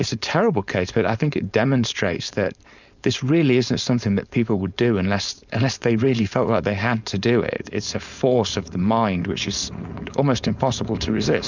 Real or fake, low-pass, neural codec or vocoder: fake; 7.2 kHz; codec, 16 kHz, 4.8 kbps, FACodec